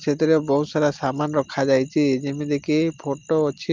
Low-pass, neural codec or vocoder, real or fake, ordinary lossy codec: 7.2 kHz; none; real; Opus, 24 kbps